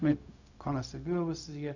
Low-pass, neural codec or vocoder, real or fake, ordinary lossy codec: 7.2 kHz; codec, 16 kHz, 0.4 kbps, LongCat-Audio-Codec; fake; none